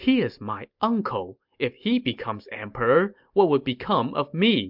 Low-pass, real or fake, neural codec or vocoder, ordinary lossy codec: 5.4 kHz; real; none; MP3, 48 kbps